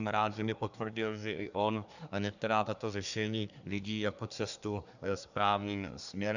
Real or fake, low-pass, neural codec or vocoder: fake; 7.2 kHz; codec, 24 kHz, 1 kbps, SNAC